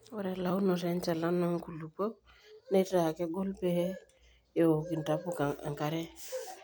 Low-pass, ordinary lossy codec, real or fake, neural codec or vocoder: none; none; real; none